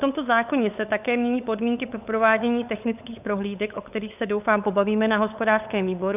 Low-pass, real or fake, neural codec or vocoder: 3.6 kHz; fake; codec, 16 kHz, 8 kbps, FunCodec, trained on LibriTTS, 25 frames a second